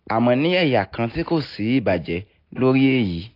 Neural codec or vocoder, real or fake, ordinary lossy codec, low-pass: none; real; AAC, 32 kbps; 5.4 kHz